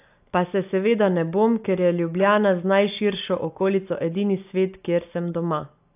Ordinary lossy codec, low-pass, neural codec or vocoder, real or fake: AAC, 32 kbps; 3.6 kHz; none; real